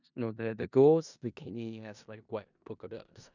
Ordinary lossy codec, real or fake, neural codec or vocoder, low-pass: none; fake; codec, 16 kHz in and 24 kHz out, 0.4 kbps, LongCat-Audio-Codec, four codebook decoder; 7.2 kHz